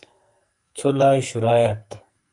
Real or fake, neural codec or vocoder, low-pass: fake; codec, 44.1 kHz, 2.6 kbps, SNAC; 10.8 kHz